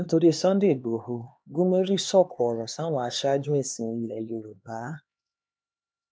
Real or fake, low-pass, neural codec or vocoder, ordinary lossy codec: fake; none; codec, 16 kHz, 2 kbps, X-Codec, HuBERT features, trained on LibriSpeech; none